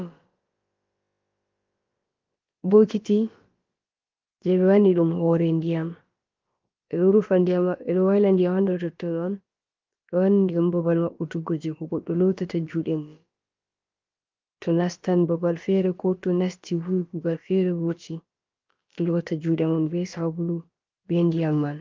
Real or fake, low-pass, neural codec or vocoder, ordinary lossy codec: fake; 7.2 kHz; codec, 16 kHz, about 1 kbps, DyCAST, with the encoder's durations; Opus, 32 kbps